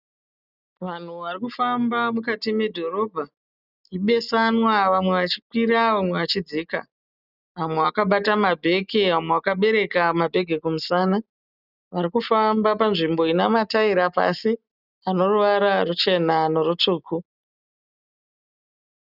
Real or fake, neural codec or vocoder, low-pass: real; none; 5.4 kHz